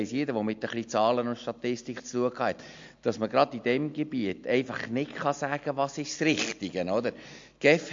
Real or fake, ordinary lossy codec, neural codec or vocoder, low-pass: real; MP3, 48 kbps; none; 7.2 kHz